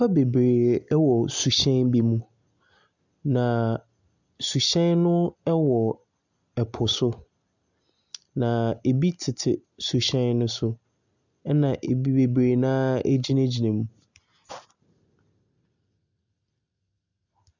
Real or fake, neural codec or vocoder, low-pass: real; none; 7.2 kHz